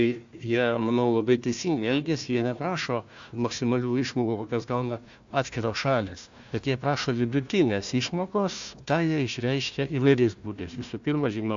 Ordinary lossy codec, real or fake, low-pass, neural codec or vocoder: AAC, 64 kbps; fake; 7.2 kHz; codec, 16 kHz, 1 kbps, FunCodec, trained on Chinese and English, 50 frames a second